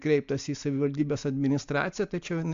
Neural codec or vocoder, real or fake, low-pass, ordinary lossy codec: none; real; 7.2 kHz; AAC, 64 kbps